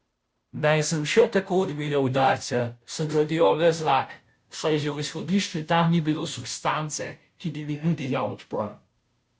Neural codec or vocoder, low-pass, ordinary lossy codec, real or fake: codec, 16 kHz, 0.5 kbps, FunCodec, trained on Chinese and English, 25 frames a second; none; none; fake